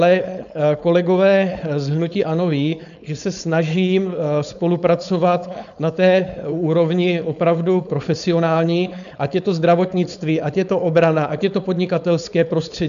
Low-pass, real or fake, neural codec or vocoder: 7.2 kHz; fake; codec, 16 kHz, 4.8 kbps, FACodec